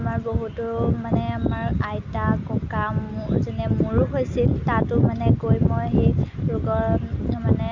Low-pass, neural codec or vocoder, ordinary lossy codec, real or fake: 7.2 kHz; none; Opus, 64 kbps; real